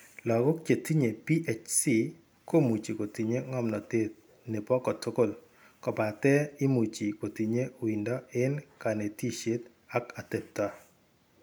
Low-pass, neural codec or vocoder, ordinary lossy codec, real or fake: none; none; none; real